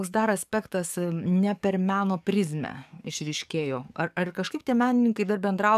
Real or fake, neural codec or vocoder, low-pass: fake; codec, 44.1 kHz, 7.8 kbps, DAC; 14.4 kHz